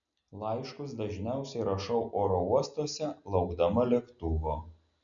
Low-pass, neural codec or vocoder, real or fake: 7.2 kHz; none; real